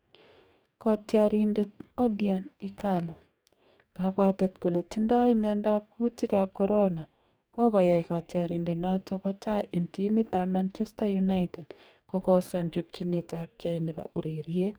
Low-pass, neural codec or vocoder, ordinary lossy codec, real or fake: none; codec, 44.1 kHz, 2.6 kbps, DAC; none; fake